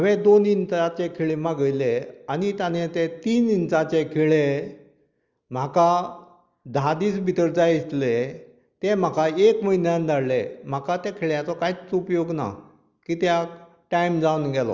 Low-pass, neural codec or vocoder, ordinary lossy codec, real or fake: 7.2 kHz; none; Opus, 32 kbps; real